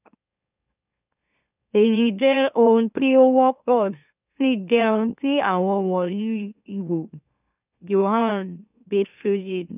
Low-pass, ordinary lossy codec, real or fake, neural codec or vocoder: 3.6 kHz; none; fake; autoencoder, 44.1 kHz, a latent of 192 numbers a frame, MeloTTS